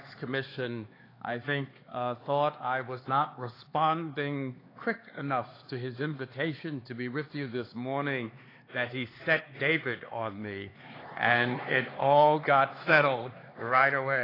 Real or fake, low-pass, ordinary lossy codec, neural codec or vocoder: fake; 5.4 kHz; AAC, 24 kbps; codec, 16 kHz, 4 kbps, X-Codec, HuBERT features, trained on LibriSpeech